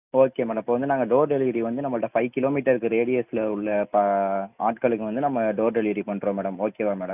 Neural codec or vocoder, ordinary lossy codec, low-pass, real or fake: none; none; 3.6 kHz; real